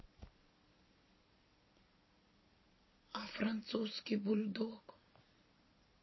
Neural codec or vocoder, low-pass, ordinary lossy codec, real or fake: vocoder, 44.1 kHz, 128 mel bands every 256 samples, BigVGAN v2; 7.2 kHz; MP3, 24 kbps; fake